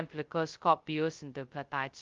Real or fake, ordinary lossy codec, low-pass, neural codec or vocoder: fake; Opus, 32 kbps; 7.2 kHz; codec, 16 kHz, 0.2 kbps, FocalCodec